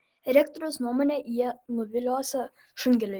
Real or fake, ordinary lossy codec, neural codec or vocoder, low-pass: real; Opus, 16 kbps; none; 19.8 kHz